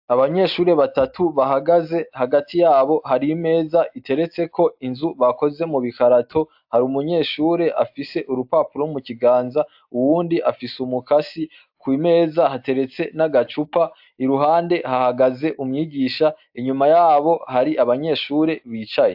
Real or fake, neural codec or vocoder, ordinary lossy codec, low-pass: real; none; AAC, 48 kbps; 5.4 kHz